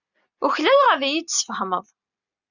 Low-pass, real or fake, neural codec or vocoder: 7.2 kHz; real; none